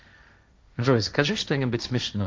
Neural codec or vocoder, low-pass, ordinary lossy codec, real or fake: codec, 16 kHz, 1.1 kbps, Voila-Tokenizer; none; none; fake